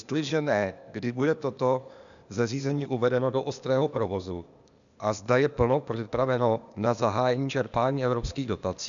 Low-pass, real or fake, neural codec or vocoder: 7.2 kHz; fake; codec, 16 kHz, 0.8 kbps, ZipCodec